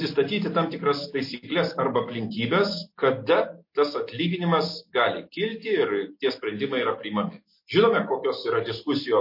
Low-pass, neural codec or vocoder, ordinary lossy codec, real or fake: 5.4 kHz; none; MP3, 32 kbps; real